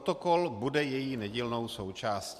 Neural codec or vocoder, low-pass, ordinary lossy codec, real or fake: vocoder, 44.1 kHz, 128 mel bands every 512 samples, BigVGAN v2; 14.4 kHz; MP3, 96 kbps; fake